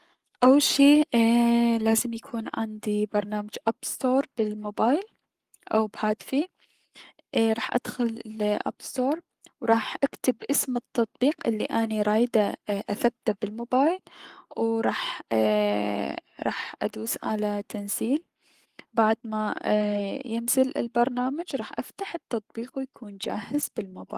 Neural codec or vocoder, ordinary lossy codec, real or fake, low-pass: codec, 44.1 kHz, 7.8 kbps, Pupu-Codec; Opus, 24 kbps; fake; 14.4 kHz